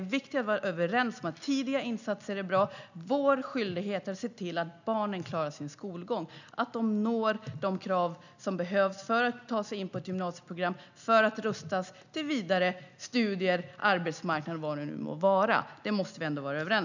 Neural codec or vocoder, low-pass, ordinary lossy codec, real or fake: none; 7.2 kHz; none; real